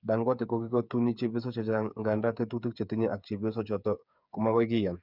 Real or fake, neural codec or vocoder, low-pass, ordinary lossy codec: fake; codec, 16 kHz, 8 kbps, FreqCodec, smaller model; 5.4 kHz; none